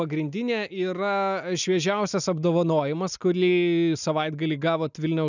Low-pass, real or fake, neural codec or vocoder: 7.2 kHz; real; none